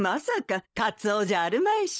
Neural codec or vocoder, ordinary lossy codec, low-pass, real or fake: codec, 16 kHz, 16 kbps, FunCodec, trained on LibriTTS, 50 frames a second; none; none; fake